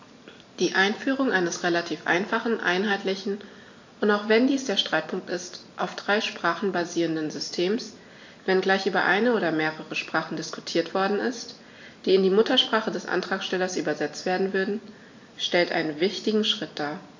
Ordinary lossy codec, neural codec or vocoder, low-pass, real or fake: AAC, 48 kbps; none; 7.2 kHz; real